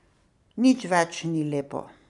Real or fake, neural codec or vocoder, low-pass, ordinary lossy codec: fake; vocoder, 44.1 kHz, 128 mel bands every 512 samples, BigVGAN v2; 10.8 kHz; none